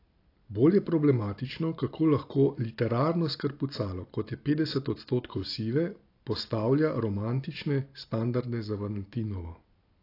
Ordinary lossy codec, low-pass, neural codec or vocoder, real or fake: AAC, 32 kbps; 5.4 kHz; none; real